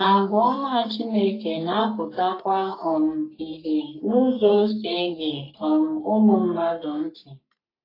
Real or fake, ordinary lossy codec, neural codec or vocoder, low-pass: fake; AAC, 32 kbps; codec, 44.1 kHz, 3.4 kbps, Pupu-Codec; 5.4 kHz